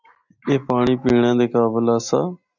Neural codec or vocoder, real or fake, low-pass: none; real; 7.2 kHz